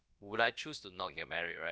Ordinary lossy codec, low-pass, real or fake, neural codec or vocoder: none; none; fake; codec, 16 kHz, about 1 kbps, DyCAST, with the encoder's durations